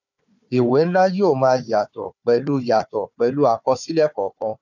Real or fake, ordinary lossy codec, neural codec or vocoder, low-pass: fake; none; codec, 16 kHz, 4 kbps, FunCodec, trained on Chinese and English, 50 frames a second; 7.2 kHz